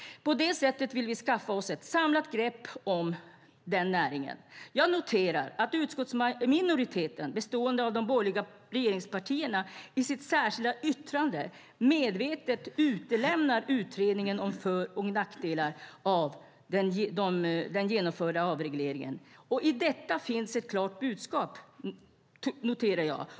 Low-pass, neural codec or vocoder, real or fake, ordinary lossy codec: none; none; real; none